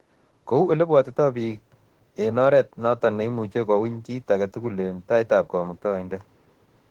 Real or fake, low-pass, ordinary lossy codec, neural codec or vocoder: fake; 19.8 kHz; Opus, 16 kbps; autoencoder, 48 kHz, 32 numbers a frame, DAC-VAE, trained on Japanese speech